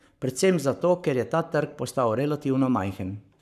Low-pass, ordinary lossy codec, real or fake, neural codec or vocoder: 14.4 kHz; none; fake; codec, 44.1 kHz, 7.8 kbps, Pupu-Codec